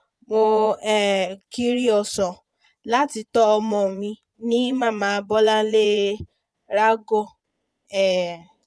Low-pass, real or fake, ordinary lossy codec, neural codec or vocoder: none; fake; none; vocoder, 22.05 kHz, 80 mel bands, Vocos